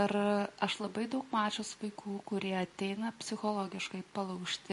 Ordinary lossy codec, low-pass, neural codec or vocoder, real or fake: MP3, 48 kbps; 14.4 kHz; none; real